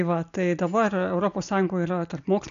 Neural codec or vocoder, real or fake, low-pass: none; real; 7.2 kHz